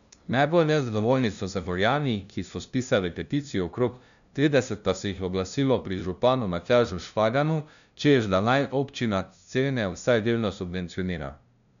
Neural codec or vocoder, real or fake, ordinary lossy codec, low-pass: codec, 16 kHz, 0.5 kbps, FunCodec, trained on LibriTTS, 25 frames a second; fake; none; 7.2 kHz